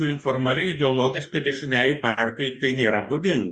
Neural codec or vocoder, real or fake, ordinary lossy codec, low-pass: codec, 44.1 kHz, 2.6 kbps, DAC; fake; Opus, 64 kbps; 10.8 kHz